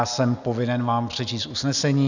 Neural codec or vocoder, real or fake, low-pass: none; real; 7.2 kHz